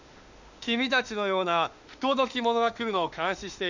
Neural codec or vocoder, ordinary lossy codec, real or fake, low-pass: autoencoder, 48 kHz, 32 numbers a frame, DAC-VAE, trained on Japanese speech; Opus, 64 kbps; fake; 7.2 kHz